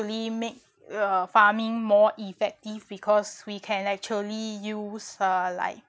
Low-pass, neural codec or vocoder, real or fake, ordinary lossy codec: none; none; real; none